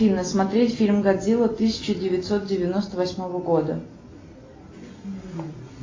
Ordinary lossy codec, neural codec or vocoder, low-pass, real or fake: AAC, 32 kbps; none; 7.2 kHz; real